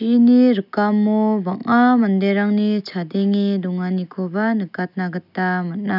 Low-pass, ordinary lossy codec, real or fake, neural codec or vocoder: 5.4 kHz; none; real; none